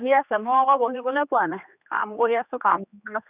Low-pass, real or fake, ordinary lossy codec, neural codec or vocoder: 3.6 kHz; fake; none; codec, 16 kHz, 2 kbps, X-Codec, HuBERT features, trained on general audio